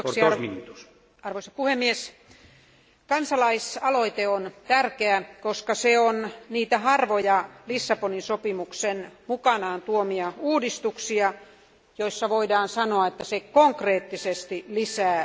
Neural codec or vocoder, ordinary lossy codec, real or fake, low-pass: none; none; real; none